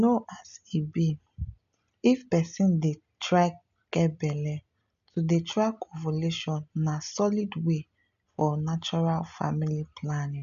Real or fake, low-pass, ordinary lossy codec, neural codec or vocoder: real; 7.2 kHz; none; none